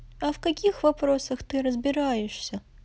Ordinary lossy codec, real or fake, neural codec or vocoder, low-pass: none; real; none; none